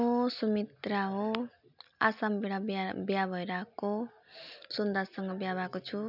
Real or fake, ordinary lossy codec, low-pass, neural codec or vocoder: real; none; 5.4 kHz; none